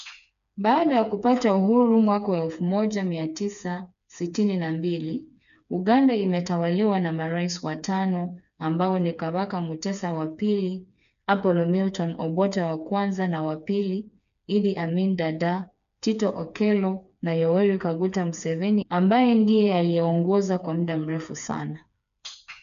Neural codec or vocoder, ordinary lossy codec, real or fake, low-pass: codec, 16 kHz, 4 kbps, FreqCodec, smaller model; none; fake; 7.2 kHz